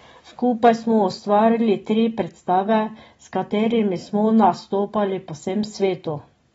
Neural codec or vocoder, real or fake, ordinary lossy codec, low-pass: none; real; AAC, 24 kbps; 19.8 kHz